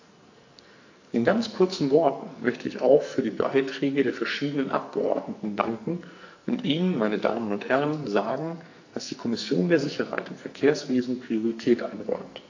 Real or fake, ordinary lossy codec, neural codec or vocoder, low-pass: fake; AAC, 48 kbps; codec, 44.1 kHz, 2.6 kbps, SNAC; 7.2 kHz